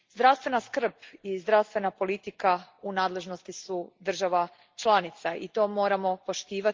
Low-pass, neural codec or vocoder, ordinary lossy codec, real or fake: 7.2 kHz; none; Opus, 32 kbps; real